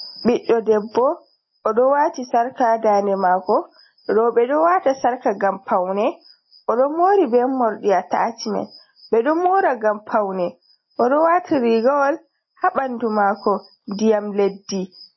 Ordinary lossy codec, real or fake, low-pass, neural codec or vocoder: MP3, 24 kbps; real; 7.2 kHz; none